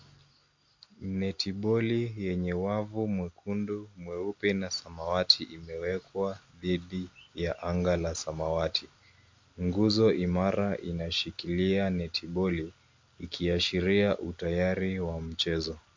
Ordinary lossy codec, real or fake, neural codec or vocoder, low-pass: MP3, 48 kbps; real; none; 7.2 kHz